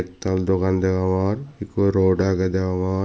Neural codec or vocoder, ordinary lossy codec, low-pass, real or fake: none; none; none; real